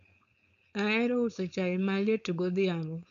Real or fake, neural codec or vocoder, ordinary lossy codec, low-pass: fake; codec, 16 kHz, 4.8 kbps, FACodec; none; 7.2 kHz